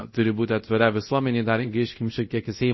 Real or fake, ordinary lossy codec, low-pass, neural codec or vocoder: fake; MP3, 24 kbps; 7.2 kHz; codec, 24 kHz, 0.5 kbps, DualCodec